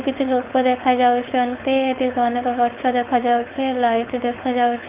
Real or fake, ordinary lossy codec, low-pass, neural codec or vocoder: fake; Opus, 24 kbps; 3.6 kHz; codec, 16 kHz, 4.8 kbps, FACodec